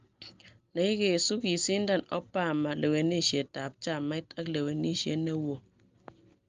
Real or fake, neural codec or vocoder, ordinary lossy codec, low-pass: real; none; Opus, 32 kbps; 7.2 kHz